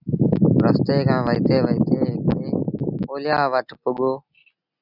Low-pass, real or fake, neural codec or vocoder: 5.4 kHz; real; none